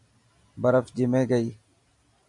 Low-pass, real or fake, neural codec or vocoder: 10.8 kHz; real; none